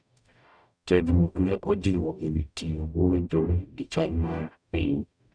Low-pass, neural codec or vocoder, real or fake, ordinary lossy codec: 9.9 kHz; codec, 44.1 kHz, 0.9 kbps, DAC; fake; none